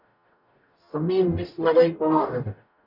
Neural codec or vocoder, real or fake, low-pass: codec, 44.1 kHz, 0.9 kbps, DAC; fake; 5.4 kHz